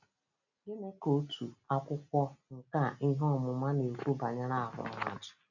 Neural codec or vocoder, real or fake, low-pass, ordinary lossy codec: none; real; 7.2 kHz; none